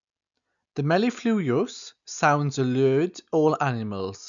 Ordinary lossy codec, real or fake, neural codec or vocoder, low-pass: none; real; none; 7.2 kHz